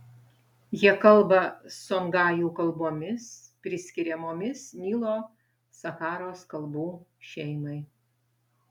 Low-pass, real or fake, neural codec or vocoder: 19.8 kHz; real; none